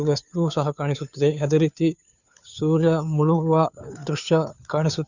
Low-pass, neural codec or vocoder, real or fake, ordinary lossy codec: 7.2 kHz; codec, 16 kHz, 2 kbps, FunCodec, trained on Chinese and English, 25 frames a second; fake; none